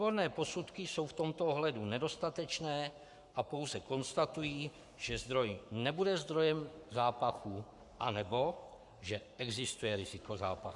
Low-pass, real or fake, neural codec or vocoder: 10.8 kHz; fake; codec, 44.1 kHz, 7.8 kbps, Pupu-Codec